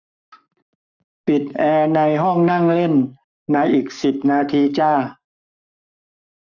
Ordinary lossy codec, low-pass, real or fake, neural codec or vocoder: none; 7.2 kHz; fake; codec, 44.1 kHz, 7.8 kbps, Pupu-Codec